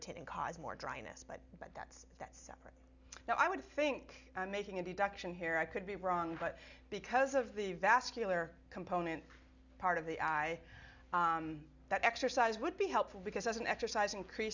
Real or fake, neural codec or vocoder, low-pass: real; none; 7.2 kHz